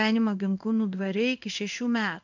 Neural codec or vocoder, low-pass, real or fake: codec, 16 kHz in and 24 kHz out, 1 kbps, XY-Tokenizer; 7.2 kHz; fake